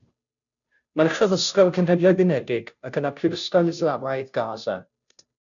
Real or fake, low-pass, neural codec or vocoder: fake; 7.2 kHz; codec, 16 kHz, 0.5 kbps, FunCodec, trained on Chinese and English, 25 frames a second